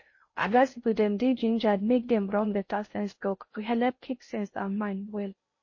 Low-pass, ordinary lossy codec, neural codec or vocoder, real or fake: 7.2 kHz; MP3, 32 kbps; codec, 16 kHz in and 24 kHz out, 0.6 kbps, FocalCodec, streaming, 4096 codes; fake